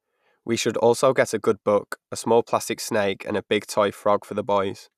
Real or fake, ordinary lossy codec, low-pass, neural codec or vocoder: real; none; 14.4 kHz; none